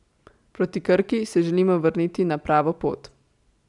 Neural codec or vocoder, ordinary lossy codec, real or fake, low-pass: none; none; real; 10.8 kHz